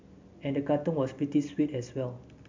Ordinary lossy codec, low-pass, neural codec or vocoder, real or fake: MP3, 64 kbps; 7.2 kHz; none; real